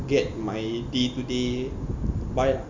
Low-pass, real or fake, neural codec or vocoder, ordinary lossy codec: 7.2 kHz; real; none; Opus, 64 kbps